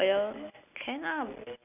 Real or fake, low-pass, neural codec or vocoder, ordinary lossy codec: real; 3.6 kHz; none; none